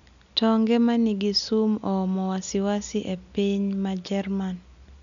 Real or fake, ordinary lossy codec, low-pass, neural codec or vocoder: real; none; 7.2 kHz; none